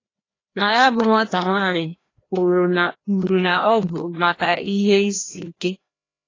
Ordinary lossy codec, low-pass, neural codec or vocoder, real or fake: AAC, 32 kbps; 7.2 kHz; codec, 16 kHz, 1 kbps, FreqCodec, larger model; fake